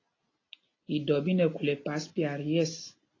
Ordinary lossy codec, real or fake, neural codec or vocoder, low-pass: AAC, 32 kbps; real; none; 7.2 kHz